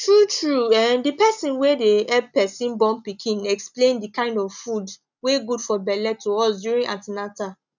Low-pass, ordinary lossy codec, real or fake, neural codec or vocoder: 7.2 kHz; none; real; none